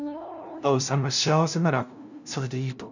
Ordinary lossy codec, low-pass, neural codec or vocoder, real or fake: none; 7.2 kHz; codec, 16 kHz, 0.5 kbps, FunCodec, trained on LibriTTS, 25 frames a second; fake